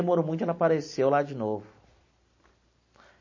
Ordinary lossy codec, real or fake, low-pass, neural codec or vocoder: MP3, 32 kbps; real; 7.2 kHz; none